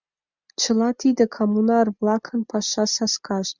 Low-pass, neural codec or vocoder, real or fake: 7.2 kHz; none; real